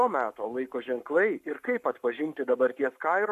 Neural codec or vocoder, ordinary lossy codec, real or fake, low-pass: codec, 44.1 kHz, 7.8 kbps, Pupu-Codec; AAC, 96 kbps; fake; 14.4 kHz